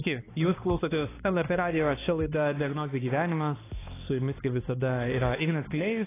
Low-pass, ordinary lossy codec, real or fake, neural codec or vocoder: 3.6 kHz; AAC, 16 kbps; fake; codec, 16 kHz, 2 kbps, X-Codec, HuBERT features, trained on balanced general audio